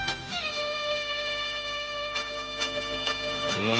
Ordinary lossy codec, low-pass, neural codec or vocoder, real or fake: none; none; codec, 16 kHz, 0.9 kbps, LongCat-Audio-Codec; fake